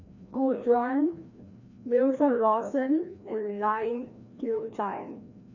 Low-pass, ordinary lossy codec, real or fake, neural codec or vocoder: 7.2 kHz; none; fake; codec, 16 kHz, 1 kbps, FreqCodec, larger model